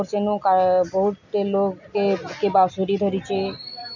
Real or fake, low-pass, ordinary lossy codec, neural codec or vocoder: real; 7.2 kHz; none; none